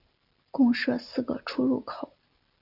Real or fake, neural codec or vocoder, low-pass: real; none; 5.4 kHz